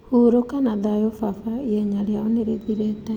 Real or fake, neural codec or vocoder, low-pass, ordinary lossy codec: real; none; 19.8 kHz; none